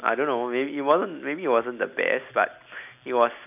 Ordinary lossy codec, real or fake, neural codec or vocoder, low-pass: none; real; none; 3.6 kHz